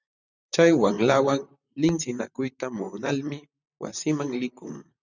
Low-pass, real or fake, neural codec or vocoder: 7.2 kHz; fake; vocoder, 44.1 kHz, 128 mel bands, Pupu-Vocoder